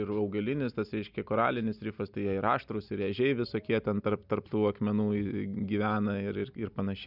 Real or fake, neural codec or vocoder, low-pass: real; none; 5.4 kHz